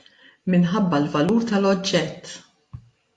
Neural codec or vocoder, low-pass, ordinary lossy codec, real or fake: none; 10.8 kHz; AAC, 48 kbps; real